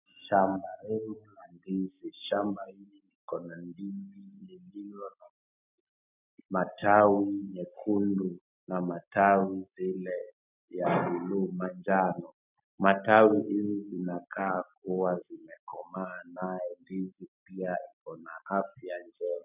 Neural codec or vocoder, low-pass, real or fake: none; 3.6 kHz; real